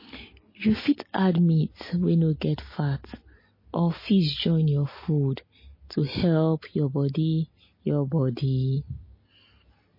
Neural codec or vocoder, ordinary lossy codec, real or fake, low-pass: none; MP3, 24 kbps; real; 5.4 kHz